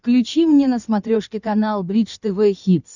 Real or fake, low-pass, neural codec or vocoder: fake; 7.2 kHz; codec, 16 kHz in and 24 kHz out, 2.2 kbps, FireRedTTS-2 codec